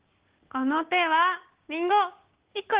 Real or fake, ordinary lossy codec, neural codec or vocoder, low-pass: fake; Opus, 16 kbps; codec, 16 kHz, 6 kbps, DAC; 3.6 kHz